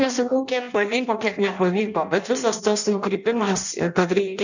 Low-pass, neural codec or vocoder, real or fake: 7.2 kHz; codec, 16 kHz in and 24 kHz out, 0.6 kbps, FireRedTTS-2 codec; fake